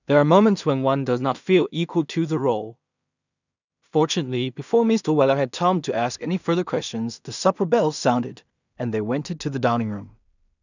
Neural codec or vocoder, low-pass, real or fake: codec, 16 kHz in and 24 kHz out, 0.4 kbps, LongCat-Audio-Codec, two codebook decoder; 7.2 kHz; fake